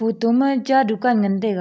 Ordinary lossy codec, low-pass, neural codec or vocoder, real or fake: none; none; none; real